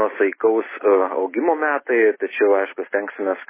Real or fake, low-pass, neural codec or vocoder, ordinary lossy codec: real; 3.6 kHz; none; MP3, 16 kbps